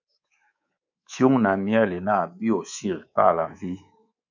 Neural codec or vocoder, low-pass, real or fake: codec, 24 kHz, 3.1 kbps, DualCodec; 7.2 kHz; fake